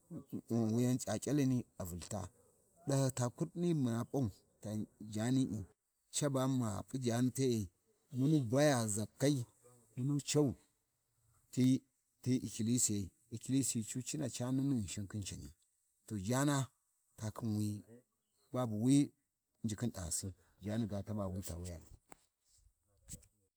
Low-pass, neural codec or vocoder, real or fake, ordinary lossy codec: none; none; real; none